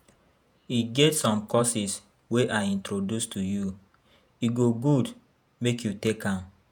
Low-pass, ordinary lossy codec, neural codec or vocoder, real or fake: none; none; vocoder, 48 kHz, 128 mel bands, Vocos; fake